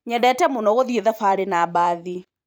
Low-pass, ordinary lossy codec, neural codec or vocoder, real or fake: none; none; vocoder, 44.1 kHz, 128 mel bands every 512 samples, BigVGAN v2; fake